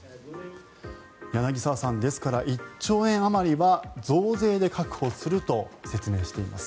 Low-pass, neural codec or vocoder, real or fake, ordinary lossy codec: none; none; real; none